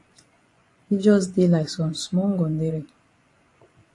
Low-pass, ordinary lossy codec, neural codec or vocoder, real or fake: 10.8 kHz; AAC, 48 kbps; vocoder, 24 kHz, 100 mel bands, Vocos; fake